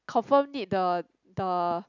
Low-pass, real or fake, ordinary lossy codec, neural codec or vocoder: 7.2 kHz; real; none; none